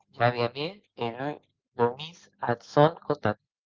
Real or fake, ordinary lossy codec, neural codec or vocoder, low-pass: real; Opus, 24 kbps; none; 7.2 kHz